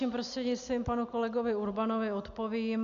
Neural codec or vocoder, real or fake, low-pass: none; real; 7.2 kHz